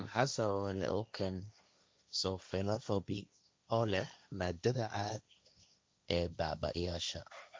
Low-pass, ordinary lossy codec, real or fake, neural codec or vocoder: none; none; fake; codec, 16 kHz, 1.1 kbps, Voila-Tokenizer